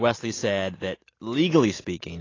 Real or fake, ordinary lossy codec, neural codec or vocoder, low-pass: real; AAC, 32 kbps; none; 7.2 kHz